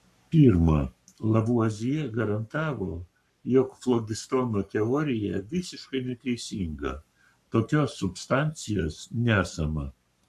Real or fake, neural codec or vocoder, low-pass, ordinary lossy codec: fake; codec, 44.1 kHz, 7.8 kbps, Pupu-Codec; 14.4 kHz; MP3, 96 kbps